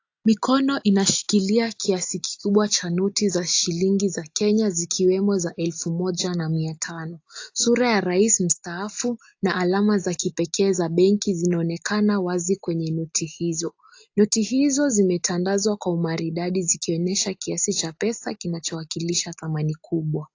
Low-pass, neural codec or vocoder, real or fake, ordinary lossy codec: 7.2 kHz; none; real; AAC, 48 kbps